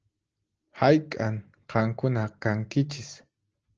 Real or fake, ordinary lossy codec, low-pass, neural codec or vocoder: real; Opus, 16 kbps; 7.2 kHz; none